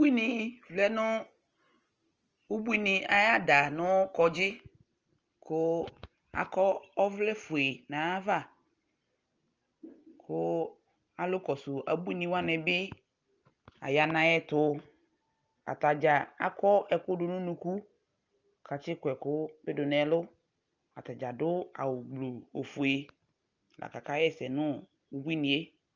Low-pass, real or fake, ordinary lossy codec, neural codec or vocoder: 7.2 kHz; real; Opus, 24 kbps; none